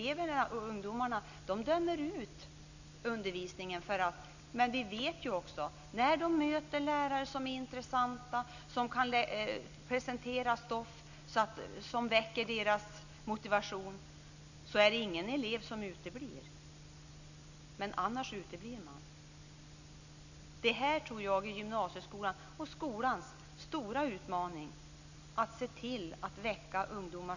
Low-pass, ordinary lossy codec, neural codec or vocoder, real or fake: 7.2 kHz; none; none; real